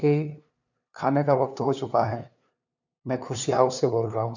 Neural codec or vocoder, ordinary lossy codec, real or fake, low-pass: codec, 16 kHz, 2 kbps, FunCodec, trained on LibriTTS, 25 frames a second; none; fake; 7.2 kHz